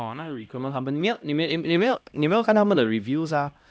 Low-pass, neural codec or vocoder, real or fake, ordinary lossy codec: none; codec, 16 kHz, 1 kbps, X-Codec, HuBERT features, trained on LibriSpeech; fake; none